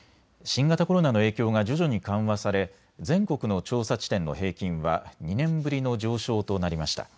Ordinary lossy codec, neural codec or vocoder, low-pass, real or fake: none; none; none; real